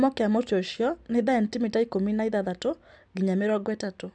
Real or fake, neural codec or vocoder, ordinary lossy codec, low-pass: real; none; none; 9.9 kHz